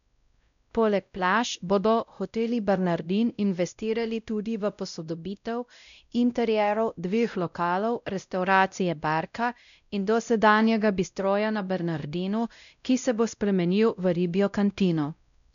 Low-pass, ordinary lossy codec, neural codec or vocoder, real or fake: 7.2 kHz; none; codec, 16 kHz, 0.5 kbps, X-Codec, WavLM features, trained on Multilingual LibriSpeech; fake